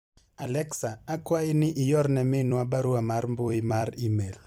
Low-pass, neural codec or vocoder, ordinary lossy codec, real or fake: 19.8 kHz; vocoder, 44.1 kHz, 128 mel bands every 256 samples, BigVGAN v2; MP3, 96 kbps; fake